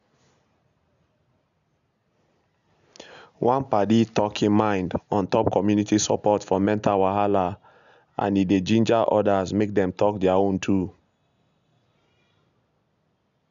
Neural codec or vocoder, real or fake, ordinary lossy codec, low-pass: none; real; none; 7.2 kHz